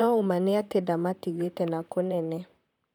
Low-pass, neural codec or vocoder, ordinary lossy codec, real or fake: 19.8 kHz; vocoder, 44.1 kHz, 128 mel bands, Pupu-Vocoder; none; fake